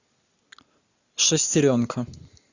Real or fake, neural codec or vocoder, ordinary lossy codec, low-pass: real; none; AAC, 48 kbps; 7.2 kHz